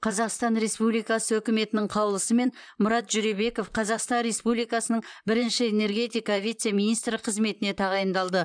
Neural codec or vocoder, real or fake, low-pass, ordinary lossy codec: vocoder, 44.1 kHz, 128 mel bands, Pupu-Vocoder; fake; 9.9 kHz; none